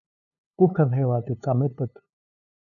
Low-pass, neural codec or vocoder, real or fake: 7.2 kHz; codec, 16 kHz, 2 kbps, FunCodec, trained on LibriTTS, 25 frames a second; fake